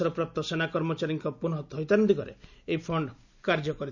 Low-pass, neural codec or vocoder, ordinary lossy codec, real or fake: 7.2 kHz; none; none; real